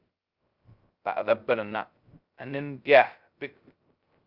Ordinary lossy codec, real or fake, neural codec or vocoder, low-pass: Opus, 24 kbps; fake; codec, 16 kHz, 0.2 kbps, FocalCodec; 5.4 kHz